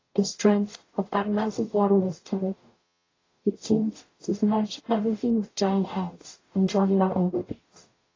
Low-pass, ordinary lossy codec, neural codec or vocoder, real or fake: 7.2 kHz; AAC, 32 kbps; codec, 44.1 kHz, 0.9 kbps, DAC; fake